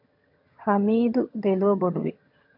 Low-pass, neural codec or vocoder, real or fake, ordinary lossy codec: 5.4 kHz; vocoder, 22.05 kHz, 80 mel bands, HiFi-GAN; fake; MP3, 48 kbps